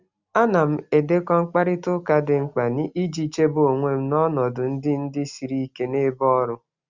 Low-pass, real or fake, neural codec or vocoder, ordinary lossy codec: 7.2 kHz; real; none; Opus, 64 kbps